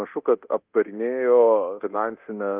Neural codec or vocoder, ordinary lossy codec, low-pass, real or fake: codec, 24 kHz, 0.9 kbps, DualCodec; Opus, 32 kbps; 3.6 kHz; fake